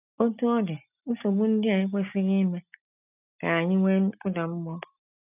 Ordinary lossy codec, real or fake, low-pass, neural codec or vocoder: none; real; 3.6 kHz; none